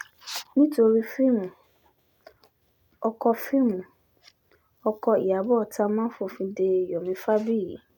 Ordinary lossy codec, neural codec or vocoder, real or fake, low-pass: none; none; real; 19.8 kHz